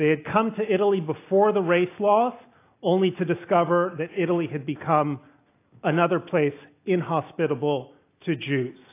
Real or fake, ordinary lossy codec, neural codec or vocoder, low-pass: real; AAC, 24 kbps; none; 3.6 kHz